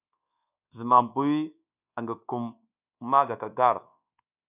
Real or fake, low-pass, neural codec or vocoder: fake; 3.6 kHz; codec, 24 kHz, 1.2 kbps, DualCodec